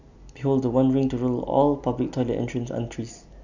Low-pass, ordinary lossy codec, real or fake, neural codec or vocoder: 7.2 kHz; none; real; none